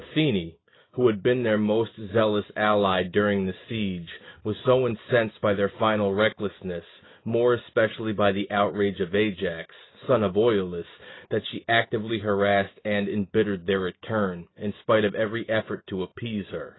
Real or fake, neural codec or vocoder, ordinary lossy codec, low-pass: real; none; AAC, 16 kbps; 7.2 kHz